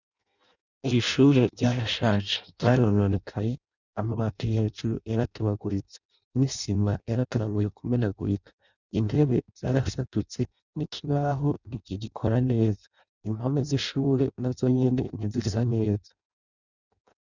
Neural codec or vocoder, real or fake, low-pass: codec, 16 kHz in and 24 kHz out, 0.6 kbps, FireRedTTS-2 codec; fake; 7.2 kHz